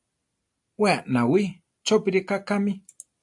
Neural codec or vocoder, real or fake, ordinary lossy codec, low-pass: none; real; AAC, 64 kbps; 10.8 kHz